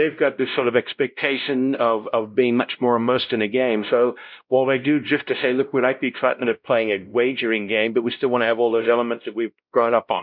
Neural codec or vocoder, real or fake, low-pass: codec, 16 kHz, 0.5 kbps, X-Codec, WavLM features, trained on Multilingual LibriSpeech; fake; 5.4 kHz